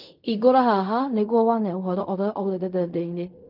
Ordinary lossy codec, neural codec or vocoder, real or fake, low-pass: none; codec, 16 kHz in and 24 kHz out, 0.4 kbps, LongCat-Audio-Codec, fine tuned four codebook decoder; fake; 5.4 kHz